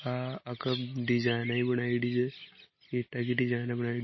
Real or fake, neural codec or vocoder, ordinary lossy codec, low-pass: real; none; MP3, 24 kbps; 7.2 kHz